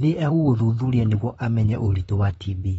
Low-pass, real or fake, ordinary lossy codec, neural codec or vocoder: 19.8 kHz; fake; AAC, 24 kbps; vocoder, 44.1 kHz, 128 mel bands, Pupu-Vocoder